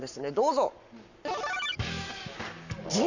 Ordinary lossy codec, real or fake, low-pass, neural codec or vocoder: none; fake; 7.2 kHz; codec, 44.1 kHz, 7.8 kbps, Pupu-Codec